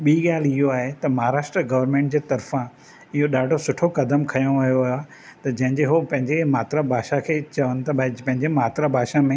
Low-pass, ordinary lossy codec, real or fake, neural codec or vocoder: none; none; real; none